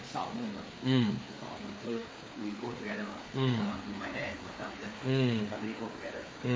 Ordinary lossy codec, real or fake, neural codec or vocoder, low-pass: none; fake; codec, 16 kHz, 4 kbps, FreqCodec, larger model; none